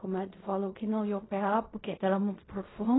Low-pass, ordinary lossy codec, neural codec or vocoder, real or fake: 7.2 kHz; AAC, 16 kbps; codec, 16 kHz in and 24 kHz out, 0.4 kbps, LongCat-Audio-Codec, fine tuned four codebook decoder; fake